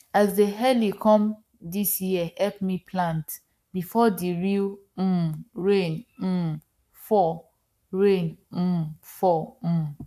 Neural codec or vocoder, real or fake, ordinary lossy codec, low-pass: codec, 44.1 kHz, 7.8 kbps, Pupu-Codec; fake; AAC, 96 kbps; 14.4 kHz